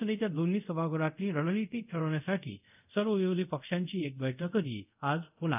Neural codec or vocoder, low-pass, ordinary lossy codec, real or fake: codec, 24 kHz, 0.5 kbps, DualCodec; 3.6 kHz; none; fake